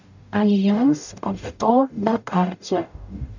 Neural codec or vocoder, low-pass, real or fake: codec, 44.1 kHz, 0.9 kbps, DAC; 7.2 kHz; fake